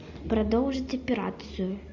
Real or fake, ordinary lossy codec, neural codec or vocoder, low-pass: real; MP3, 48 kbps; none; 7.2 kHz